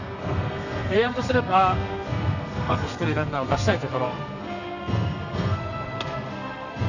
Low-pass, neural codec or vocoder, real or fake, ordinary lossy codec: 7.2 kHz; codec, 32 kHz, 1.9 kbps, SNAC; fake; none